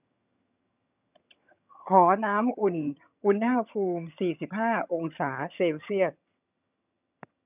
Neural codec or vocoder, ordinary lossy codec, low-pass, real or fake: vocoder, 22.05 kHz, 80 mel bands, HiFi-GAN; none; 3.6 kHz; fake